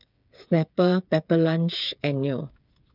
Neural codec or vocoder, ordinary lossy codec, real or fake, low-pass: codec, 16 kHz, 8 kbps, FreqCodec, smaller model; none; fake; 5.4 kHz